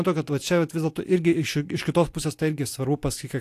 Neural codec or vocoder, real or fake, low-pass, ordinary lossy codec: none; real; 14.4 kHz; AAC, 64 kbps